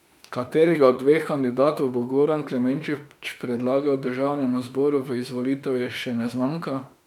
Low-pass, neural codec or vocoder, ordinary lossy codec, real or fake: 19.8 kHz; autoencoder, 48 kHz, 32 numbers a frame, DAC-VAE, trained on Japanese speech; none; fake